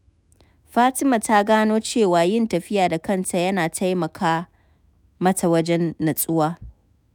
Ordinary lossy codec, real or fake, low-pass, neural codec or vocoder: none; fake; none; autoencoder, 48 kHz, 128 numbers a frame, DAC-VAE, trained on Japanese speech